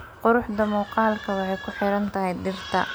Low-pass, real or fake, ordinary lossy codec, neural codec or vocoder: none; real; none; none